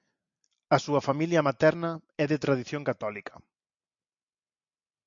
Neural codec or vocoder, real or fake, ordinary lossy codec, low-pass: none; real; MP3, 64 kbps; 7.2 kHz